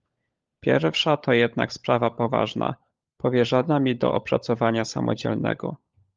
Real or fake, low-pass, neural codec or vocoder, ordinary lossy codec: fake; 7.2 kHz; codec, 16 kHz, 16 kbps, FunCodec, trained on LibriTTS, 50 frames a second; Opus, 32 kbps